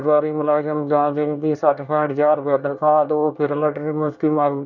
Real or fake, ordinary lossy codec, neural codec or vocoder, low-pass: fake; none; codec, 24 kHz, 1 kbps, SNAC; 7.2 kHz